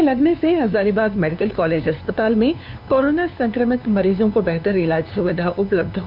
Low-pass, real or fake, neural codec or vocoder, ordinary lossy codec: 5.4 kHz; fake; codec, 16 kHz, 2 kbps, FunCodec, trained on LibriTTS, 25 frames a second; none